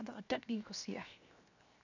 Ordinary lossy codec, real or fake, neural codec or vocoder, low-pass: none; fake; codec, 16 kHz, 0.7 kbps, FocalCodec; 7.2 kHz